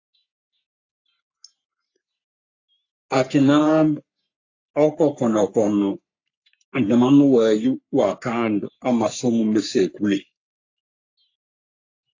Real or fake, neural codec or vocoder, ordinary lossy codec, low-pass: fake; codec, 44.1 kHz, 3.4 kbps, Pupu-Codec; AAC, 48 kbps; 7.2 kHz